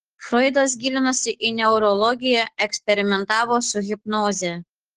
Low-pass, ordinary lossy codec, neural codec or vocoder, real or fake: 14.4 kHz; Opus, 16 kbps; codec, 44.1 kHz, 7.8 kbps, DAC; fake